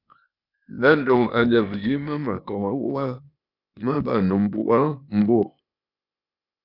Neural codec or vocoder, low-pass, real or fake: codec, 16 kHz, 0.8 kbps, ZipCodec; 5.4 kHz; fake